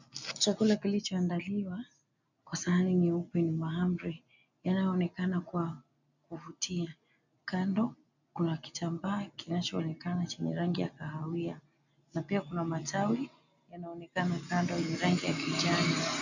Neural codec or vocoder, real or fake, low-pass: none; real; 7.2 kHz